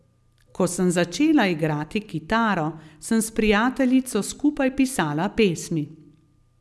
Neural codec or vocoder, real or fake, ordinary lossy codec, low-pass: none; real; none; none